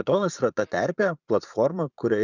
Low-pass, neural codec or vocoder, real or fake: 7.2 kHz; vocoder, 44.1 kHz, 80 mel bands, Vocos; fake